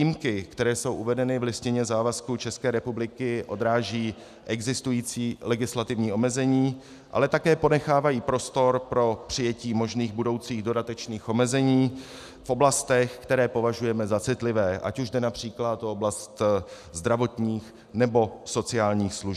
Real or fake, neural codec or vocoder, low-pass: real; none; 14.4 kHz